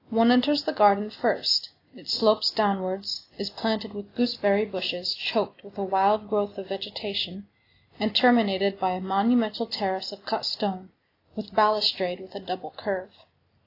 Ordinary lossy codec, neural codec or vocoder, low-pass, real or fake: AAC, 32 kbps; none; 5.4 kHz; real